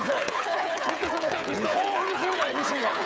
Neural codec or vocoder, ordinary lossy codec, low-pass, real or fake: codec, 16 kHz, 8 kbps, FreqCodec, smaller model; none; none; fake